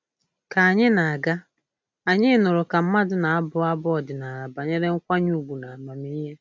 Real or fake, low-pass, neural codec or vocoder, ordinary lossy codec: real; none; none; none